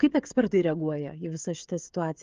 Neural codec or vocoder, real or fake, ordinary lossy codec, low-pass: codec, 16 kHz, 16 kbps, FreqCodec, smaller model; fake; Opus, 24 kbps; 7.2 kHz